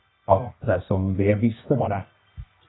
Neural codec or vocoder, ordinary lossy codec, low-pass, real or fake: codec, 24 kHz, 0.9 kbps, WavTokenizer, medium music audio release; AAC, 16 kbps; 7.2 kHz; fake